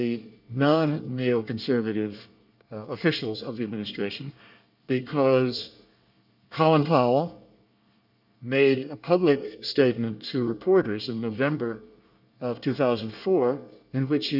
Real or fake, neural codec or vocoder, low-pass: fake; codec, 24 kHz, 1 kbps, SNAC; 5.4 kHz